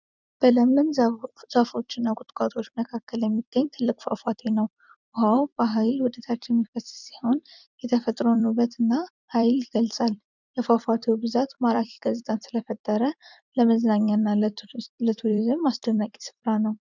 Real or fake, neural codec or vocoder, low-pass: fake; vocoder, 44.1 kHz, 128 mel bands every 256 samples, BigVGAN v2; 7.2 kHz